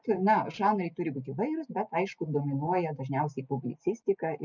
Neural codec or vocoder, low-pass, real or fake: none; 7.2 kHz; real